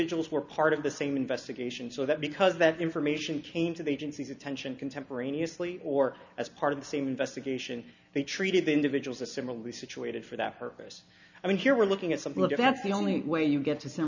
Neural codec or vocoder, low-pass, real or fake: none; 7.2 kHz; real